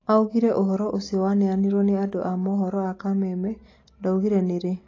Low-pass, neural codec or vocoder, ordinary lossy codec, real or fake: 7.2 kHz; none; AAC, 32 kbps; real